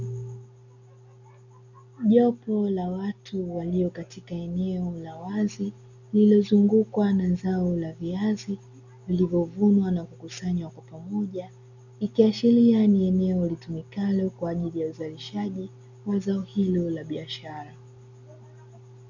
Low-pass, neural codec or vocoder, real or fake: 7.2 kHz; none; real